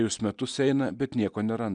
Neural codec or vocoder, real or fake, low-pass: none; real; 9.9 kHz